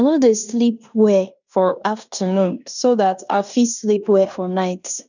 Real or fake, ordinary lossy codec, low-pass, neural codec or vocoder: fake; none; 7.2 kHz; codec, 16 kHz in and 24 kHz out, 0.9 kbps, LongCat-Audio-Codec, fine tuned four codebook decoder